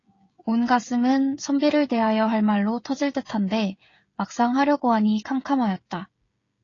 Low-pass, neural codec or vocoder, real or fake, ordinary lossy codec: 7.2 kHz; codec, 16 kHz, 16 kbps, FreqCodec, smaller model; fake; AAC, 32 kbps